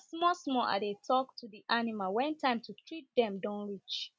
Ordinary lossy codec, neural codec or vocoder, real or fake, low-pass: none; none; real; none